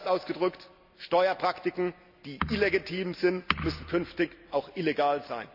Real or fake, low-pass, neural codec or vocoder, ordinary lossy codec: real; 5.4 kHz; none; none